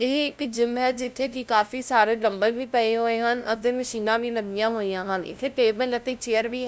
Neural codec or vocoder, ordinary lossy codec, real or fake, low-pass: codec, 16 kHz, 0.5 kbps, FunCodec, trained on LibriTTS, 25 frames a second; none; fake; none